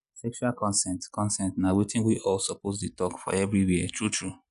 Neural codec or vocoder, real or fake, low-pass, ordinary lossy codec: none; real; 10.8 kHz; none